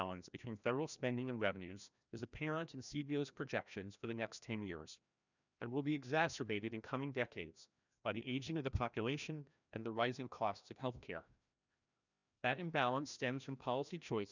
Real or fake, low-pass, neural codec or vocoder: fake; 7.2 kHz; codec, 16 kHz, 1 kbps, FreqCodec, larger model